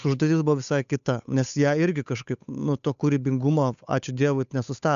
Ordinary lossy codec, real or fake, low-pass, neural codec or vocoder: AAC, 96 kbps; fake; 7.2 kHz; codec, 16 kHz, 8 kbps, FunCodec, trained on Chinese and English, 25 frames a second